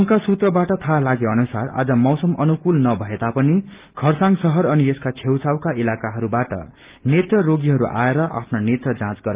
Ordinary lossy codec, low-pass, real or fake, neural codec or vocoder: Opus, 32 kbps; 3.6 kHz; real; none